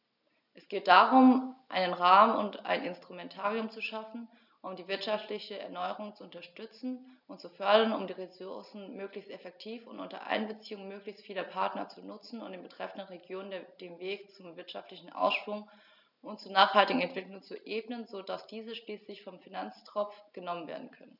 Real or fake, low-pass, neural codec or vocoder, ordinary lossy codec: real; 5.4 kHz; none; none